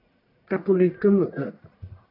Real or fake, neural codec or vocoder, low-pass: fake; codec, 44.1 kHz, 1.7 kbps, Pupu-Codec; 5.4 kHz